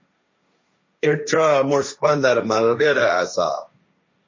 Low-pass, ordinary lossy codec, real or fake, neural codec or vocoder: 7.2 kHz; MP3, 32 kbps; fake; codec, 16 kHz, 1.1 kbps, Voila-Tokenizer